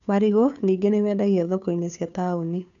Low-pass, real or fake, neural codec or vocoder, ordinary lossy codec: 7.2 kHz; fake; codec, 16 kHz, 2 kbps, FunCodec, trained on Chinese and English, 25 frames a second; none